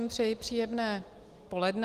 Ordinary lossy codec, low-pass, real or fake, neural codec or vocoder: Opus, 24 kbps; 14.4 kHz; real; none